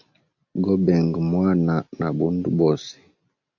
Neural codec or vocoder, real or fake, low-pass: none; real; 7.2 kHz